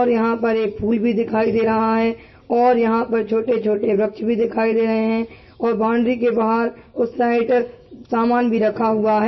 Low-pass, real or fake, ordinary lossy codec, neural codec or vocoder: 7.2 kHz; real; MP3, 24 kbps; none